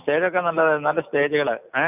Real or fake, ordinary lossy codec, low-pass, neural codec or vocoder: real; none; 3.6 kHz; none